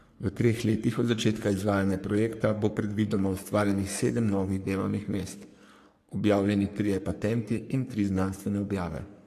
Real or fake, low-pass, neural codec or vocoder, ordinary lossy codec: fake; 14.4 kHz; codec, 44.1 kHz, 3.4 kbps, Pupu-Codec; AAC, 64 kbps